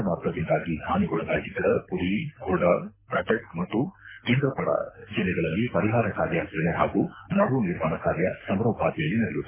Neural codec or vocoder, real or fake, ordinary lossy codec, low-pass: codec, 24 kHz, 6 kbps, HILCodec; fake; MP3, 16 kbps; 3.6 kHz